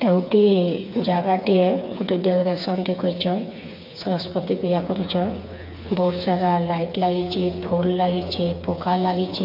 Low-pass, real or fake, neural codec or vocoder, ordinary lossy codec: 5.4 kHz; fake; codec, 16 kHz, 4 kbps, FreqCodec, smaller model; MP3, 48 kbps